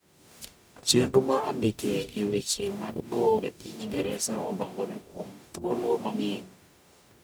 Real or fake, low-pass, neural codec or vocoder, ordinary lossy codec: fake; none; codec, 44.1 kHz, 0.9 kbps, DAC; none